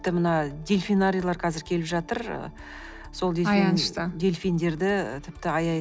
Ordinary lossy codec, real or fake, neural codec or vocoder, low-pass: none; real; none; none